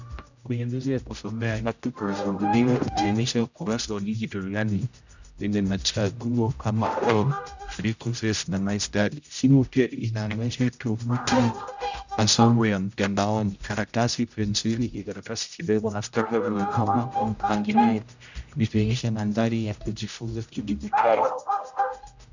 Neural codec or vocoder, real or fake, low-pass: codec, 16 kHz, 0.5 kbps, X-Codec, HuBERT features, trained on general audio; fake; 7.2 kHz